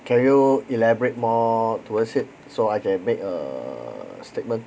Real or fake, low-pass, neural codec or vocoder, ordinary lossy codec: real; none; none; none